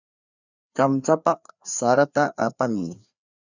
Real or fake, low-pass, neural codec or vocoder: fake; 7.2 kHz; codec, 16 kHz, 2 kbps, FreqCodec, larger model